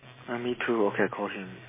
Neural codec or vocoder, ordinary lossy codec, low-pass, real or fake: none; MP3, 16 kbps; 3.6 kHz; real